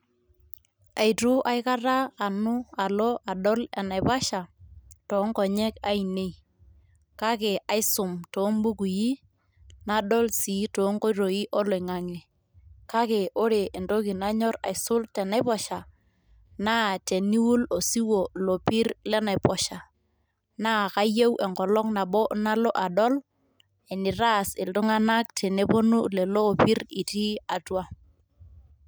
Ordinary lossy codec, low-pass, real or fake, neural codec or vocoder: none; none; real; none